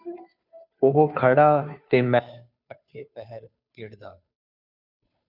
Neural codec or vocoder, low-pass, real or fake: codec, 16 kHz, 2 kbps, FunCodec, trained on Chinese and English, 25 frames a second; 5.4 kHz; fake